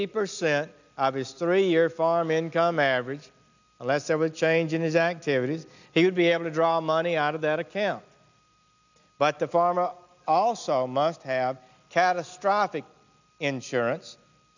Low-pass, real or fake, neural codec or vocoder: 7.2 kHz; real; none